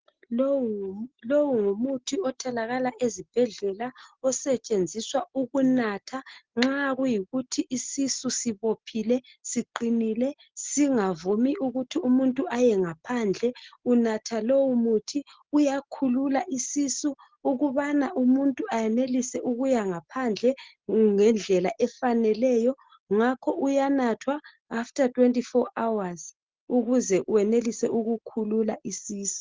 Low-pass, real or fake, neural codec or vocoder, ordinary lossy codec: 7.2 kHz; real; none; Opus, 16 kbps